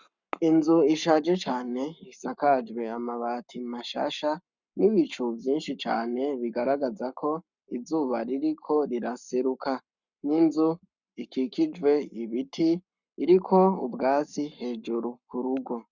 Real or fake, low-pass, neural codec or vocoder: fake; 7.2 kHz; codec, 44.1 kHz, 7.8 kbps, Pupu-Codec